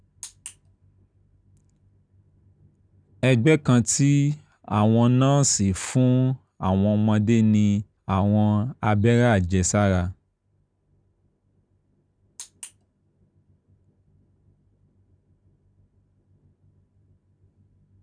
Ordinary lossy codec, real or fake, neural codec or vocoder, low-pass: none; real; none; 9.9 kHz